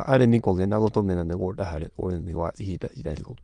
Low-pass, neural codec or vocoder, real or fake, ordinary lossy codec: 9.9 kHz; autoencoder, 22.05 kHz, a latent of 192 numbers a frame, VITS, trained on many speakers; fake; Opus, 32 kbps